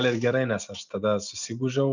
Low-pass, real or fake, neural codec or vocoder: 7.2 kHz; real; none